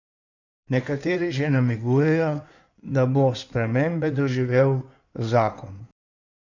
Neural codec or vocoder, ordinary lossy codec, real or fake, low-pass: codec, 16 kHz in and 24 kHz out, 2.2 kbps, FireRedTTS-2 codec; none; fake; 7.2 kHz